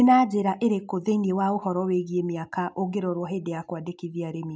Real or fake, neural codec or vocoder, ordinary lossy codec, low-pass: real; none; none; none